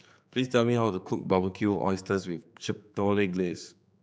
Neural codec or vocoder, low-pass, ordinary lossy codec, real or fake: codec, 16 kHz, 4 kbps, X-Codec, HuBERT features, trained on general audio; none; none; fake